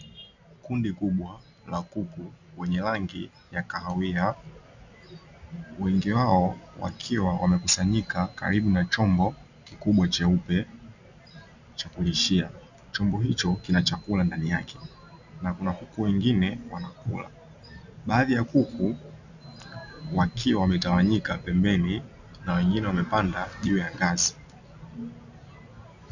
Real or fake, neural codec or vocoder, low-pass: real; none; 7.2 kHz